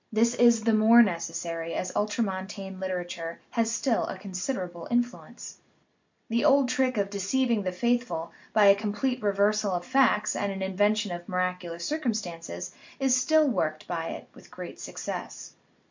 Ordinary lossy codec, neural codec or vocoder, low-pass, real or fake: MP3, 64 kbps; none; 7.2 kHz; real